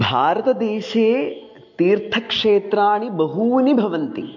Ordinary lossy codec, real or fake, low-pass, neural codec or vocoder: MP3, 48 kbps; real; 7.2 kHz; none